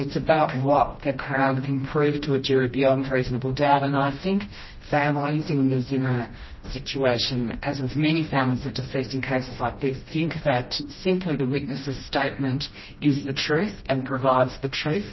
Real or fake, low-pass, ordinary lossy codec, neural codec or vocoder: fake; 7.2 kHz; MP3, 24 kbps; codec, 16 kHz, 1 kbps, FreqCodec, smaller model